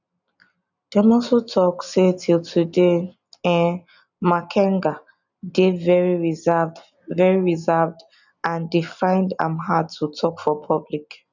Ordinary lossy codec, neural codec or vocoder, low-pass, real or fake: none; none; 7.2 kHz; real